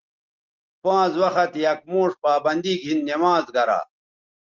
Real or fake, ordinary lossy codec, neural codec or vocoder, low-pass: real; Opus, 16 kbps; none; 7.2 kHz